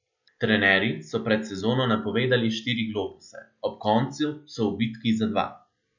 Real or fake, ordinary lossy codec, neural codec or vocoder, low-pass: real; none; none; 7.2 kHz